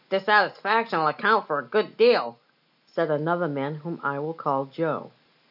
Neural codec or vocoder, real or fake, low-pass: none; real; 5.4 kHz